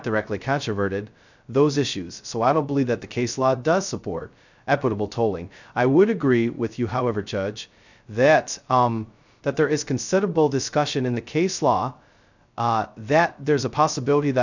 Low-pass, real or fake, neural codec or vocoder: 7.2 kHz; fake; codec, 16 kHz, 0.2 kbps, FocalCodec